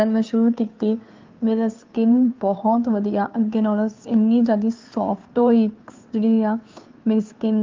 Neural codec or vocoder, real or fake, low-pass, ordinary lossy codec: codec, 16 kHz, 2 kbps, FunCodec, trained on Chinese and English, 25 frames a second; fake; 7.2 kHz; Opus, 24 kbps